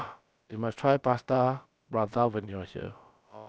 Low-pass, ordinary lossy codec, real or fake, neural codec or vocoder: none; none; fake; codec, 16 kHz, about 1 kbps, DyCAST, with the encoder's durations